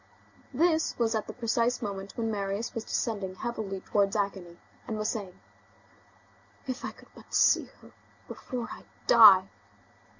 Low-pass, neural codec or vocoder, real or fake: 7.2 kHz; none; real